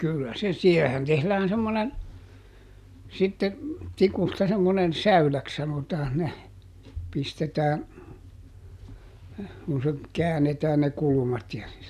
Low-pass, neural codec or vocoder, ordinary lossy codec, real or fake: 14.4 kHz; none; none; real